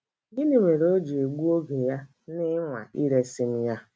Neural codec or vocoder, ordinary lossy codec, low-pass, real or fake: none; none; none; real